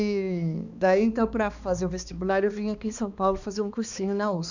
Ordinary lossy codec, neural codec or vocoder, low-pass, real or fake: none; codec, 16 kHz, 2 kbps, X-Codec, HuBERT features, trained on balanced general audio; 7.2 kHz; fake